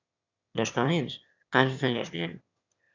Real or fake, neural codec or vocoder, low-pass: fake; autoencoder, 22.05 kHz, a latent of 192 numbers a frame, VITS, trained on one speaker; 7.2 kHz